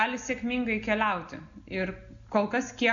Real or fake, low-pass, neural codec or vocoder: real; 7.2 kHz; none